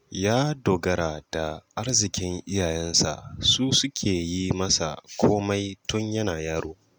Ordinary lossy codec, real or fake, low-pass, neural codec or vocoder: none; real; none; none